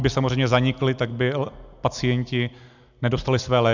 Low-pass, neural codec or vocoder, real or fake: 7.2 kHz; none; real